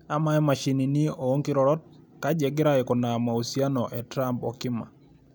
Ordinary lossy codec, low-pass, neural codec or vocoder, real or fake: none; none; none; real